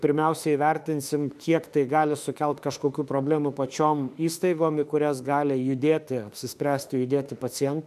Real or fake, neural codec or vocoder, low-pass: fake; autoencoder, 48 kHz, 32 numbers a frame, DAC-VAE, trained on Japanese speech; 14.4 kHz